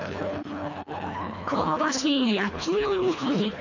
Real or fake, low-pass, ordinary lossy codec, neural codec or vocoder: fake; 7.2 kHz; none; codec, 24 kHz, 1.5 kbps, HILCodec